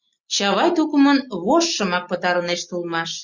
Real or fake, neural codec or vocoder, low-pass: real; none; 7.2 kHz